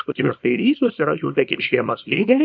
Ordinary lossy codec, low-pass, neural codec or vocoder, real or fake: MP3, 64 kbps; 7.2 kHz; codec, 24 kHz, 0.9 kbps, WavTokenizer, small release; fake